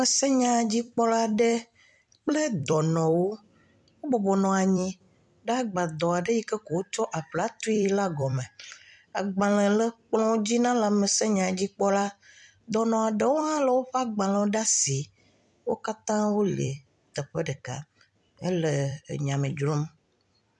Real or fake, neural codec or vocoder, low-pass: fake; vocoder, 44.1 kHz, 128 mel bands every 256 samples, BigVGAN v2; 10.8 kHz